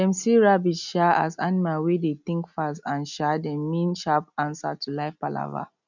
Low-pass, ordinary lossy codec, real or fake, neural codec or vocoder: 7.2 kHz; none; real; none